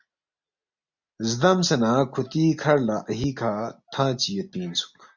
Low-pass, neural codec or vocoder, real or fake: 7.2 kHz; none; real